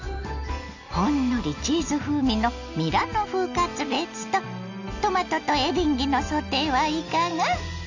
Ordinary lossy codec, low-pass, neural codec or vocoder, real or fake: none; 7.2 kHz; none; real